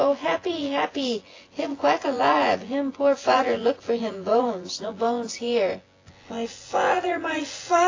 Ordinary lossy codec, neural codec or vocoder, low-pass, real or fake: AAC, 32 kbps; vocoder, 24 kHz, 100 mel bands, Vocos; 7.2 kHz; fake